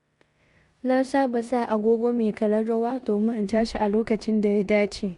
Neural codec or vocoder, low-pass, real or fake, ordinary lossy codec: codec, 16 kHz in and 24 kHz out, 0.9 kbps, LongCat-Audio-Codec, four codebook decoder; 10.8 kHz; fake; Opus, 64 kbps